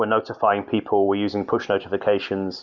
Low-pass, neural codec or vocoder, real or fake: 7.2 kHz; none; real